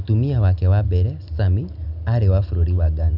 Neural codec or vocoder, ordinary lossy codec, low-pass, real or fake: none; none; 5.4 kHz; real